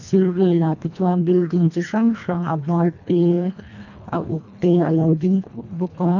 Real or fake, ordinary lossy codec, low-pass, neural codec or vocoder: fake; none; 7.2 kHz; codec, 24 kHz, 1.5 kbps, HILCodec